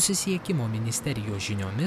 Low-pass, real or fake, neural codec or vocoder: 14.4 kHz; real; none